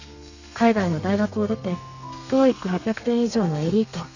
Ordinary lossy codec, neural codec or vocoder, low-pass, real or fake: none; codec, 32 kHz, 1.9 kbps, SNAC; 7.2 kHz; fake